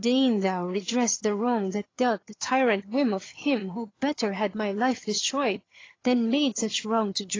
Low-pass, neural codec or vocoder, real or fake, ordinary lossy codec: 7.2 kHz; vocoder, 22.05 kHz, 80 mel bands, HiFi-GAN; fake; AAC, 32 kbps